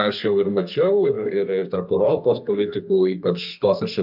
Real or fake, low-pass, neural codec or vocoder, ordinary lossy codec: fake; 5.4 kHz; codec, 32 kHz, 1.9 kbps, SNAC; AAC, 48 kbps